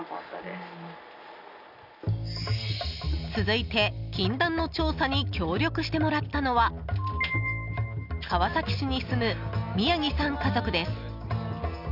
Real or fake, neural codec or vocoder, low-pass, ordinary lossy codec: real; none; 5.4 kHz; none